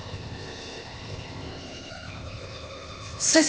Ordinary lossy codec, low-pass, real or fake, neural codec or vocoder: none; none; fake; codec, 16 kHz, 0.8 kbps, ZipCodec